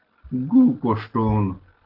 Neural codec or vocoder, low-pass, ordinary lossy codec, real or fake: none; 5.4 kHz; Opus, 16 kbps; real